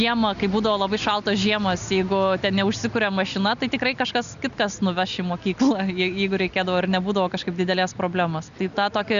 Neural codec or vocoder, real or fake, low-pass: none; real; 7.2 kHz